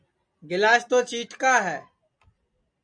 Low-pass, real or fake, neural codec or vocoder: 9.9 kHz; real; none